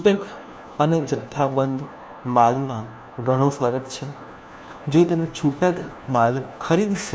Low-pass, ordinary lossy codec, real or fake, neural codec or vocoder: none; none; fake; codec, 16 kHz, 1 kbps, FunCodec, trained on LibriTTS, 50 frames a second